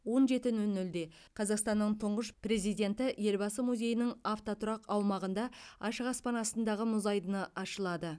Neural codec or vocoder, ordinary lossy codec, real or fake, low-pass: none; none; real; none